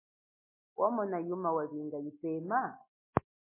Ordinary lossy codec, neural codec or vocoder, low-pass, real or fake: MP3, 16 kbps; none; 3.6 kHz; real